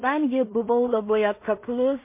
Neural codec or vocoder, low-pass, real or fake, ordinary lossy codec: codec, 16 kHz in and 24 kHz out, 0.4 kbps, LongCat-Audio-Codec, two codebook decoder; 3.6 kHz; fake; MP3, 24 kbps